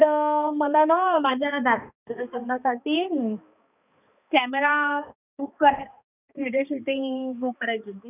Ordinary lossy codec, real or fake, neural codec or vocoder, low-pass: none; fake; codec, 16 kHz, 2 kbps, X-Codec, HuBERT features, trained on balanced general audio; 3.6 kHz